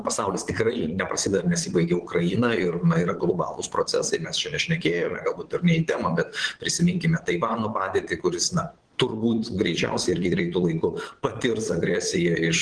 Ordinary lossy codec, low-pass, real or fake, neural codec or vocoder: Opus, 16 kbps; 9.9 kHz; fake; vocoder, 22.05 kHz, 80 mel bands, Vocos